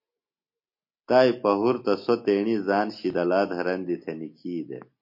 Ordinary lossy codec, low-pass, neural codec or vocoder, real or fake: MP3, 32 kbps; 5.4 kHz; none; real